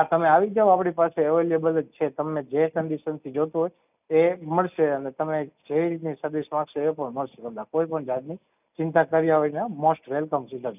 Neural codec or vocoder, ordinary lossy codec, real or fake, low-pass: none; none; real; 3.6 kHz